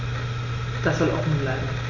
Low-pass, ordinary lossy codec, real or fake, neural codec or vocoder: 7.2 kHz; none; real; none